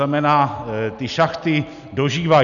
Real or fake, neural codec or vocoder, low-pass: real; none; 7.2 kHz